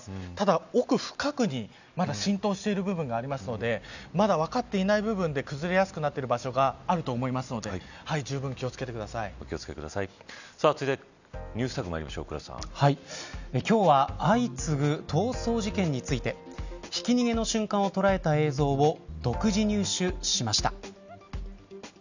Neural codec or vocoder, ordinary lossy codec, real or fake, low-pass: none; none; real; 7.2 kHz